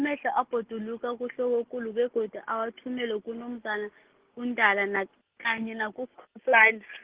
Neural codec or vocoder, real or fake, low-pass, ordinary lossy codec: none; real; 3.6 kHz; Opus, 16 kbps